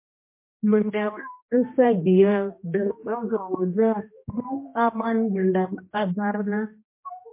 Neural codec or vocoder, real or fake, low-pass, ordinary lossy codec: codec, 16 kHz, 1 kbps, X-Codec, HuBERT features, trained on general audio; fake; 3.6 kHz; MP3, 24 kbps